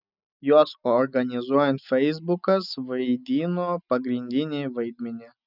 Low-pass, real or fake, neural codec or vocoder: 5.4 kHz; real; none